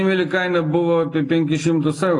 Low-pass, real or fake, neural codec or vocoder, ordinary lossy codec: 10.8 kHz; real; none; AAC, 48 kbps